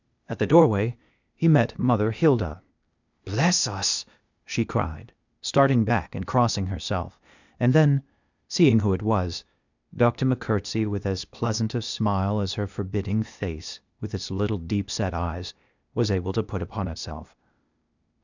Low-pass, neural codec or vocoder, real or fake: 7.2 kHz; codec, 16 kHz, 0.8 kbps, ZipCodec; fake